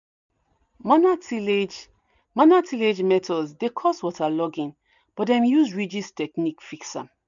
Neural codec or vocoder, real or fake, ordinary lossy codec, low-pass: none; real; none; 7.2 kHz